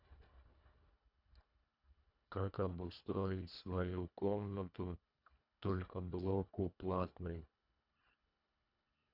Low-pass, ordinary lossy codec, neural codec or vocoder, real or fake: 5.4 kHz; none; codec, 24 kHz, 1.5 kbps, HILCodec; fake